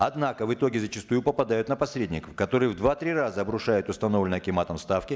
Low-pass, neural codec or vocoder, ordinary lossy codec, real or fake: none; none; none; real